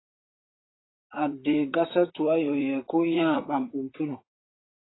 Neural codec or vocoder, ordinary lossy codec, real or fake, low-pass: vocoder, 44.1 kHz, 128 mel bands, Pupu-Vocoder; AAC, 16 kbps; fake; 7.2 kHz